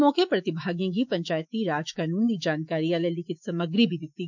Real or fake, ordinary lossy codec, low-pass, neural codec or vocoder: fake; none; 7.2 kHz; autoencoder, 48 kHz, 128 numbers a frame, DAC-VAE, trained on Japanese speech